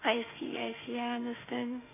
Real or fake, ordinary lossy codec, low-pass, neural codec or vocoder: fake; none; 3.6 kHz; autoencoder, 48 kHz, 32 numbers a frame, DAC-VAE, trained on Japanese speech